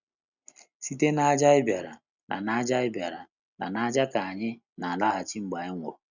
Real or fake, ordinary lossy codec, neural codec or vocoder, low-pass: real; none; none; 7.2 kHz